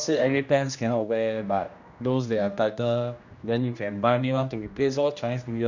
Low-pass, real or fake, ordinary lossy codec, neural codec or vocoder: 7.2 kHz; fake; none; codec, 16 kHz, 1 kbps, X-Codec, HuBERT features, trained on general audio